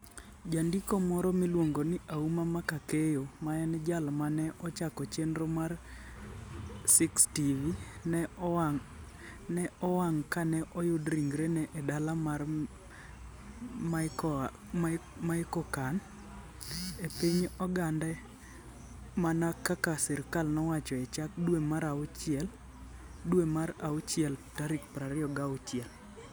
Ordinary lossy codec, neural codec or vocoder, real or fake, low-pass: none; none; real; none